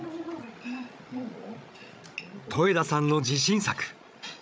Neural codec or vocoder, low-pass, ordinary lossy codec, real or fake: codec, 16 kHz, 16 kbps, FreqCodec, larger model; none; none; fake